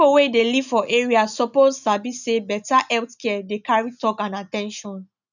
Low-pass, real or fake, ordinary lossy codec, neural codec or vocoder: 7.2 kHz; real; none; none